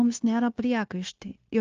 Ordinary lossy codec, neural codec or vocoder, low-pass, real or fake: Opus, 24 kbps; codec, 16 kHz, 0.9 kbps, LongCat-Audio-Codec; 7.2 kHz; fake